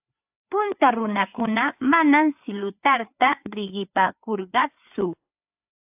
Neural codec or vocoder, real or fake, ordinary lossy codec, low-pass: codec, 16 kHz, 4 kbps, FreqCodec, larger model; fake; AAC, 32 kbps; 3.6 kHz